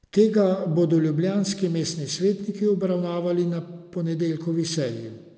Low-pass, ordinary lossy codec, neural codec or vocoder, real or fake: none; none; none; real